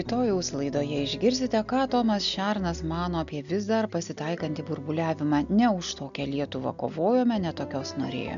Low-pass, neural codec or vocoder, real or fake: 7.2 kHz; none; real